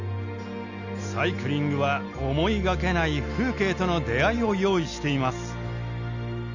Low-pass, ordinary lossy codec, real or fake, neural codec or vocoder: 7.2 kHz; Opus, 64 kbps; real; none